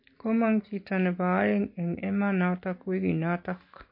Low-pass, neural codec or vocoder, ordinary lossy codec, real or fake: 5.4 kHz; none; MP3, 32 kbps; real